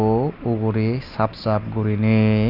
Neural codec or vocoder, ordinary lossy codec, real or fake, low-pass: none; none; real; 5.4 kHz